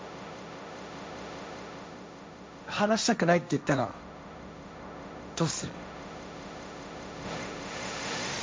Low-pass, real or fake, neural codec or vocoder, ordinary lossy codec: none; fake; codec, 16 kHz, 1.1 kbps, Voila-Tokenizer; none